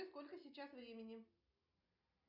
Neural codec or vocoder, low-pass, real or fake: none; 5.4 kHz; real